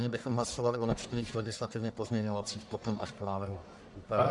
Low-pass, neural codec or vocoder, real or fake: 10.8 kHz; codec, 44.1 kHz, 1.7 kbps, Pupu-Codec; fake